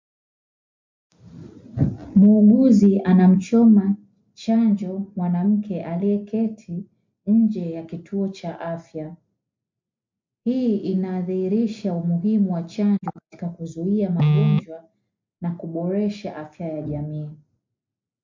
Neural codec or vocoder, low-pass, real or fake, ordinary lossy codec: none; 7.2 kHz; real; MP3, 48 kbps